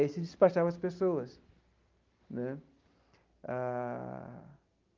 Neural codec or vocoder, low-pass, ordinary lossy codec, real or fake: none; 7.2 kHz; Opus, 32 kbps; real